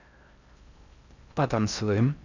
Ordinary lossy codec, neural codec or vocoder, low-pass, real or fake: none; codec, 16 kHz in and 24 kHz out, 0.6 kbps, FocalCodec, streaming, 4096 codes; 7.2 kHz; fake